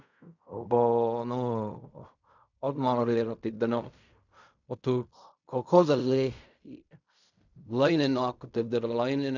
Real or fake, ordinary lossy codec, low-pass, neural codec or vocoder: fake; none; 7.2 kHz; codec, 16 kHz in and 24 kHz out, 0.4 kbps, LongCat-Audio-Codec, fine tuned four codebook decoder